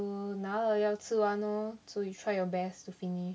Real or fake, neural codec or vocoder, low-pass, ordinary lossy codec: real; none; none; none